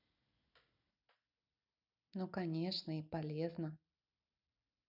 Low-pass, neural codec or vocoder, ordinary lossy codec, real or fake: 5.4 kHz; none; none; real